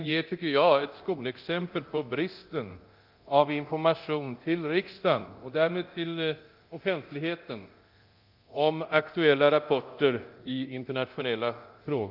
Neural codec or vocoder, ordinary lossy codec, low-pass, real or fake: codec, 24 kHz, 0.9 kbps, DualCodec; Opus, 32 kbps; 5.4 kHz; fake